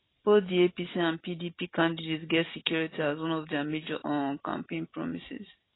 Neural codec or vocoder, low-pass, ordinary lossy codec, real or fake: none; 7.2 kHz; AAC, 16 kbps; real